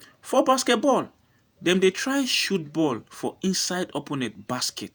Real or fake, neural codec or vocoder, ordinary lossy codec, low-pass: fake; vocoder, 48 kHz, 128 mel bands, Vocos; none; none